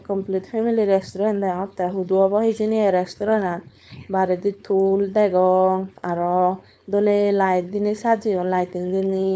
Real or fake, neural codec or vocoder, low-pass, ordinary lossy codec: fake; codec, 16 kHz, 4.8 kbps, FACodec; none; none